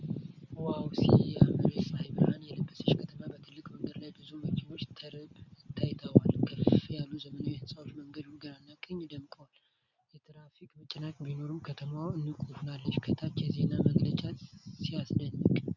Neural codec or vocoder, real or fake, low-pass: none; real; 7.2 kHz